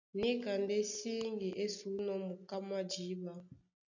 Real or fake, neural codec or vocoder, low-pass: real; none; 7.2 kHz